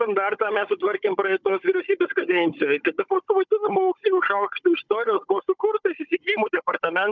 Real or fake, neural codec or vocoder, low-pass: fake; codec, 16 kHz, 16 kbps, FunCodec, trained on Chinese and English, 50 frames a second; 7.2 kHz